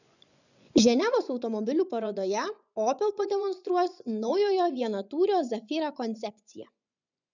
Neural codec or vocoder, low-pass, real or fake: vocoder, 24 kHz, 100 mel bands, Vocos; 7.2 kHz; fake